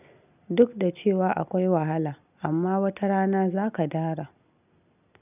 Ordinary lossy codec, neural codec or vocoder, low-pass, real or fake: none; none; 3.6 kHz; real